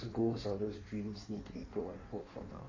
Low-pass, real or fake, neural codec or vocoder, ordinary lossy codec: 7.2 kHz; fake; codec, 16 kHz in and 24 kHz out, 1.1 kbps, FireRedTTS-2 codec; AAC, 32 kbps